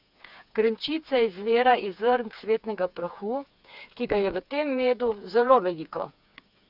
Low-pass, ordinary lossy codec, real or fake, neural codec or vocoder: 5.4 kHz; none; fake; codec, 16 kHz, 4 kbps, FreqCodec, smaller model